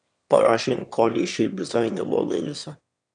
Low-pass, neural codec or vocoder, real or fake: 9.9 kHz; autoencoder, 22.05 kHz, a latent of 192 numbers a frame, VITS, trained on one speaker; fake